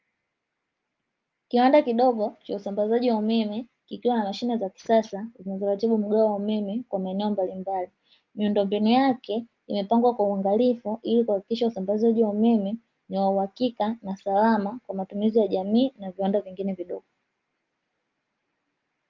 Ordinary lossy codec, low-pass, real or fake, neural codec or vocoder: Opus, 24 kbps; 7.2 kHz; real; none